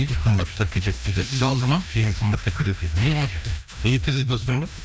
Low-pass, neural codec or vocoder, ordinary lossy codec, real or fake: none; codec, 16 kHz, 1 kbps, FreqCodec, larger model; none; fake